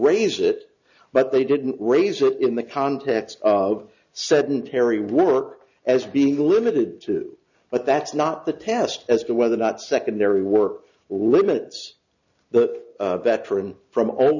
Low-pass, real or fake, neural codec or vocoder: 7.2 kHz; real; none